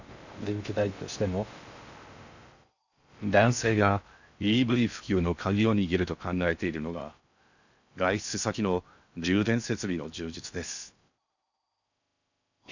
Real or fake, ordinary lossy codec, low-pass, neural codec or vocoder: fake; none; 7.2 kHz; codec, 16 kHz in and 24 kHz out, 0.6 kbps, FocalCodec, streaming, 2048 codes